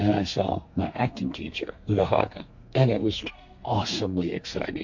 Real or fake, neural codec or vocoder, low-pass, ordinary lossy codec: fake; codec, 32 kHz, 1.9 kbps, SNAC; 7.2 kHz; MP3, 48 kbps